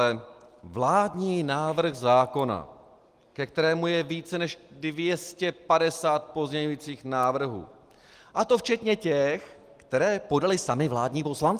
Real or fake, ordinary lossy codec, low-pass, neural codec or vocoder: real; Opus, 24 kbps; 14.4 kHz; none